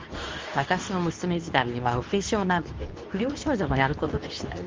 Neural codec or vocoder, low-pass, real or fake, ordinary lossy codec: codec, 24 kHz, 0.9 kbps, WavTokenizer, medium speech release version 2; 7.2 kHz; fake; Opus, 32 kbps